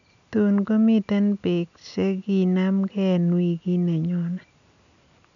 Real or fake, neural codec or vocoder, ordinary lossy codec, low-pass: real; none; none; 7.2 kHz